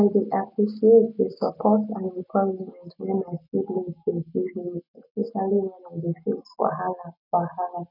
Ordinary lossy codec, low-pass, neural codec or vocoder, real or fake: none; 5.4 kHz; none; real